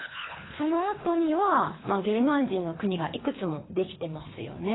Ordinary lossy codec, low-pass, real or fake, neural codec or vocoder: AAC, 16 kbps; 7.2 kHz; fake; codec, 24 kHz, 3 kbps, HILCodec